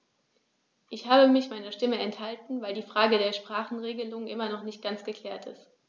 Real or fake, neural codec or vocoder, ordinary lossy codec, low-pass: real; none; none; none